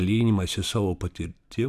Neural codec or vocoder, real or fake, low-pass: vocoder, 44.1 kHz, 128 mel bands every 256 samples, BigVGAN v2; fake; 14.4 kHz